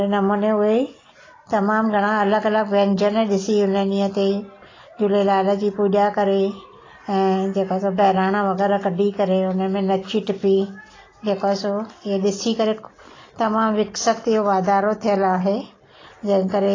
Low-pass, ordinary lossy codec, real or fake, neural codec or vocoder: 7.2 kHz; AAC, 32 kbps; real; none